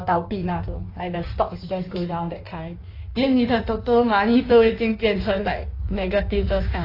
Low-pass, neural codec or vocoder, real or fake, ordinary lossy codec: 5.4 kHz; codec, 16 kHz in and 24 kHz out, 1.1 kbps, FireRedTTS-2 codec; fake; AAC, 32 kbps